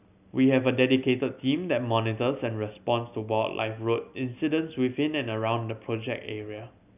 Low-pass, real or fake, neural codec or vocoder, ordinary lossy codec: 3.6 kHz; real; none; none